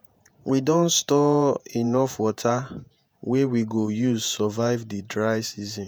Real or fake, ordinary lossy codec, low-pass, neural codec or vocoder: fake; none; none; vocoder, 48 kHz, 128 mel bands, Vocos